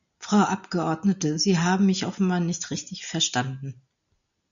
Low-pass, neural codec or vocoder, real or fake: 7.2 kHz; none; real